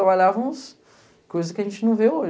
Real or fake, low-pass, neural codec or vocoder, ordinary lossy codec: real; none; none; none